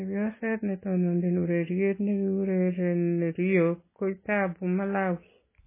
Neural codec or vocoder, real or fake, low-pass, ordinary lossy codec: none; real; 3.6 kHz; MP3, 16 kbps